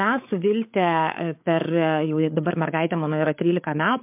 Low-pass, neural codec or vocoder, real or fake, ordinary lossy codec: 3.6 kHz; codec, 16 kHz, 8 kbps, FreqCodec, larger model; fake; MP3, 32 kbps